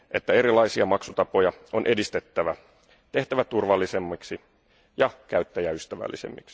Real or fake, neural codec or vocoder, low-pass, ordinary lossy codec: real; none; none; none